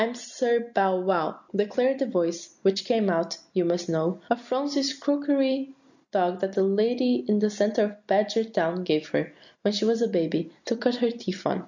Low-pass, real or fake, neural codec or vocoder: 7.2 kHz; real; none